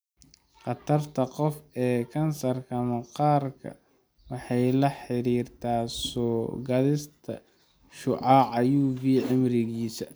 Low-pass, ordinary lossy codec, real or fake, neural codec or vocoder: none; none; real; none